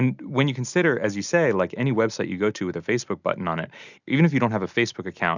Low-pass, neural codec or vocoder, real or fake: 7.2 kHz; none; real